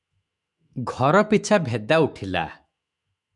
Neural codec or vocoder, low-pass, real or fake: autoencoder, 48 kHz, 128 numbers a frame, DAC-VAE, trained on Japanese speech; 10.8 kHz; fake